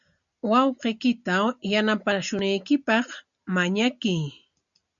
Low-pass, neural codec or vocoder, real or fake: 7.2 kHz; none; real